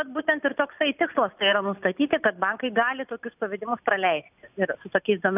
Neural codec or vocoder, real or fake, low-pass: none; real; 3.6 kHz